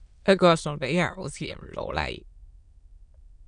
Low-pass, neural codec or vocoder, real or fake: 9.9 kHz; autoencoder, 22.05 kHz, a latent of 192 numbers a frame, VITS, trained on many speakers; fake